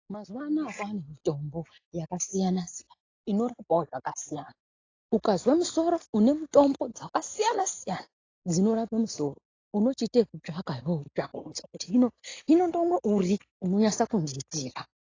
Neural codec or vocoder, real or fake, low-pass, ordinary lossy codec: none; real; 7.2 kHz; AAC, 32 kbps